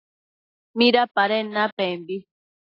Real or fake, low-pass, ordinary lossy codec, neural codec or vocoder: real; 5.4 kHz; AAC, 24 kbps; none